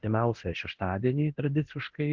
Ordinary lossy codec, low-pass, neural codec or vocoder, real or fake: Opus, 16 kbps; 7.2 kHz; codec, 24 kHz, 0.9 kbps, DualCodec; fake